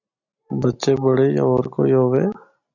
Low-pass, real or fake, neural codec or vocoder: 7.2 kHz; real; none